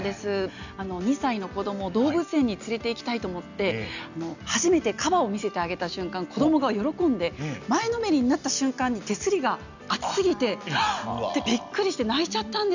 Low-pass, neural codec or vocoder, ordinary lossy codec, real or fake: 7.2 kHz; none; none; real